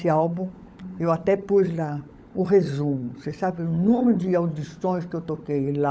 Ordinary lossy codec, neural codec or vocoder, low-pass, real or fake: none; codec, 16 kHz, 16 kbps, FunCodec, trained on LibriTTS, 50 frames a second; none; fake